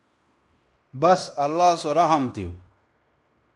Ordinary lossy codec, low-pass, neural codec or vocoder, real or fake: MP3, 64 kbps; 10.8 kHz; codec, 16 kHz in and 24 kHz out, 0.9 kbps, LongCat-Audio-Codec, fine tuned four codebook decoder; fake